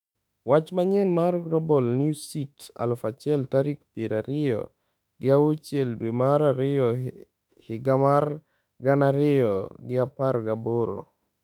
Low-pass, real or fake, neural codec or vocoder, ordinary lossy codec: 19.8 kHz; fake; autoencoder, 48 kHz, 32 numbers a frame, DAC-VAE, trained on Japanese speech; none